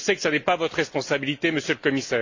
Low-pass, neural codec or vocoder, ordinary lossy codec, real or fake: 7.2 kHz; none; AAC, 48 kbps; real